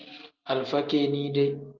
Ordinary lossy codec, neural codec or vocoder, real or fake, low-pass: Opus, 32 kbps; none; real; 7.2 kHz